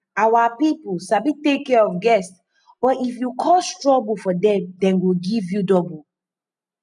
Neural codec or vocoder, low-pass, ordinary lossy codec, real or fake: none; 10.8 kHz; none; real